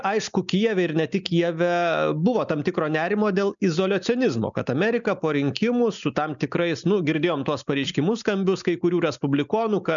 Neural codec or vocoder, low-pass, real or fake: none; 7.2 kHz; real